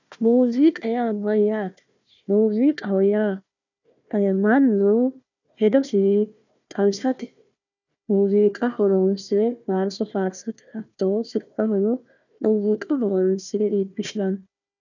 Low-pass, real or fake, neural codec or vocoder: 7.2 kHz; fake; codec, 16 kHz, 1 kbps, FunCodec, trained on Chinese and English, 50 frames a second